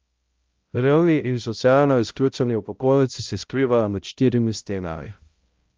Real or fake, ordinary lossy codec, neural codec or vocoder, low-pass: fake; Opus, 24 kbps; codec, 16 kHz, 0.5 kbps, X-Codec, HuBERT features, trained on balanced general audio; 7.2 kHz